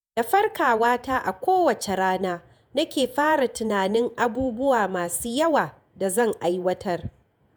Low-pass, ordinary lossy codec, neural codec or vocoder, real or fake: none; none; vocoder, 48 kHz, 128 mel bands, Vocos; fake